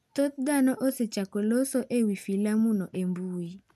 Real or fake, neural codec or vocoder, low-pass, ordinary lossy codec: real; none; 14.4 kHz; none